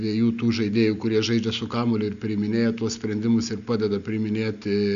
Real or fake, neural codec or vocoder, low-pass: real; none; 7.2 kHz